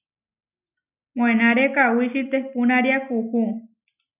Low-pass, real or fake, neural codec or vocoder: 3.6 kHz; real; none